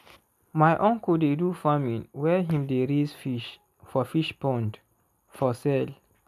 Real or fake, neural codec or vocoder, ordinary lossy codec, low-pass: real; none; none; 14.4 kHz